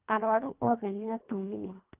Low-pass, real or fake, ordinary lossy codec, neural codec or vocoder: 3.6 kHz; fake; Opus, 32 kbps; codec, 24 kHz, 1.5 kbps, HILCodec